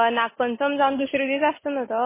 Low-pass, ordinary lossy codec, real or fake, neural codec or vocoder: 3.6 kHz; MP3, 16 kbps; real; none